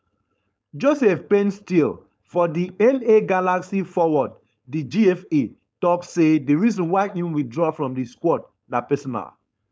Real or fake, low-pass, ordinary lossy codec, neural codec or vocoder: fake; none; none; codec, 16 kHz, 4.8 kbps, FACodec